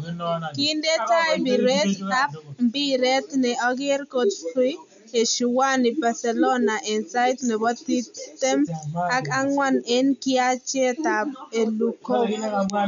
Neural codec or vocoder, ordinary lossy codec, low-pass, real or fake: none; none; 7.2 kHz; real